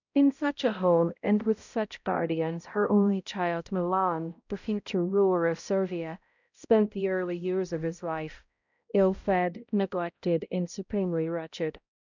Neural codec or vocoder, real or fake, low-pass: codec, 16 kHz, 0.5 kbps, X-Codec, HuBERT features, trained on balanced general audio; fake; 7.2 kHz